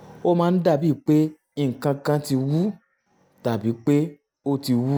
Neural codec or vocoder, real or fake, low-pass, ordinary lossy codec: none; real; none; none